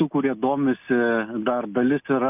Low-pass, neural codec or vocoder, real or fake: 3.6 kHz; none; real